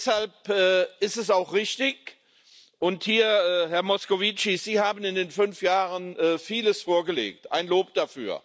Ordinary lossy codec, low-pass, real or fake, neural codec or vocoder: none; none; real; none